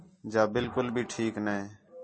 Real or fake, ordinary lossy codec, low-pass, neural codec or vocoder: real; MP3, 32 kbps; 9.9 kHz; none